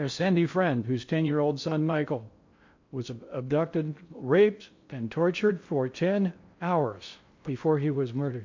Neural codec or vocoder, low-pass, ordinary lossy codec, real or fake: codec, 16 kHz in and 24 kHz out, 0.6 kbps, FocalCodec, streaming, 4096 codes; 7.2 kHz; MP3, 48 kbps; fake